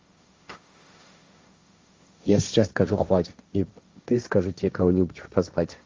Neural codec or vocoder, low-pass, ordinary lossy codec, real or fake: codec, 16 kHz, 1.1 kbps, Voila-Tokenizer; 7.2 kHz; Opus, 32 kbps; fake